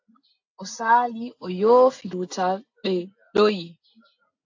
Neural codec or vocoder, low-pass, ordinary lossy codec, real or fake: none; 7.2 kHz; AAC, 48 kbps; real